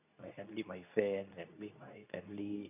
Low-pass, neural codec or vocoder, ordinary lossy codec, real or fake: 3.6 kHz; codec, 24 kHz, 0.9 kbps, WavTokenizer, medium speech release version 2; none; fake